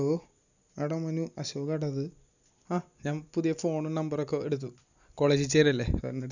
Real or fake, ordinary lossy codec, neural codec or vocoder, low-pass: real; none; none; 7.2 kHz